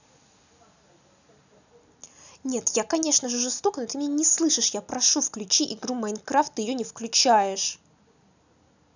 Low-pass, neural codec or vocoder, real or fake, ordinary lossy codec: 7.2 kHz; none; real; none